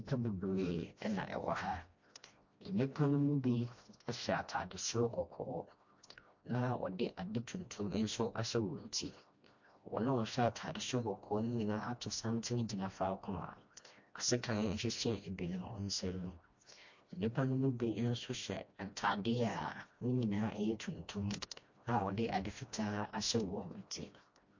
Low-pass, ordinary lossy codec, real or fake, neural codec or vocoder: 7.2 kHz; MP3, 48 kbps; fake; codec, 16 kHz, 1 kbps, FreqCodec, smaller model